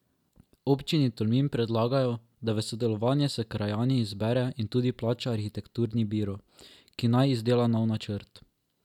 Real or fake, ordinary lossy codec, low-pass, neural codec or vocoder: real; none; 19.8 kHz; none